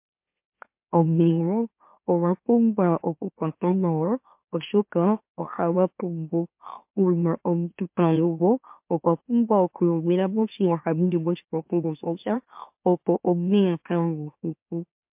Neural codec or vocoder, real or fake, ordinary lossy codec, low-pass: autoencoder, 44.1 kHz, a latent of 192 numbers a frame, MeloTTS; fake; MP3, 32 kbps; 3.6 kHz